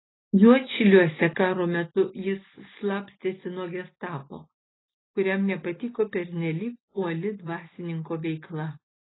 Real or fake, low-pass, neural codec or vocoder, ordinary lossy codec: real; 7.2 kHz; none; AAC, 16 kbps